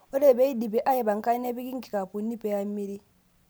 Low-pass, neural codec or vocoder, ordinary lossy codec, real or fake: none; none; none; real